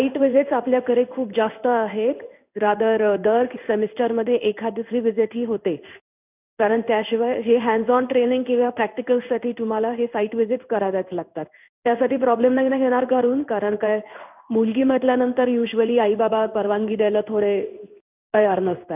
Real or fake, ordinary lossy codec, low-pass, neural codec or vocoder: fake; none; 3.6 kHz; codec, 16 kHz in and 24 kHz out, 1 kbps, XY-Tokenizer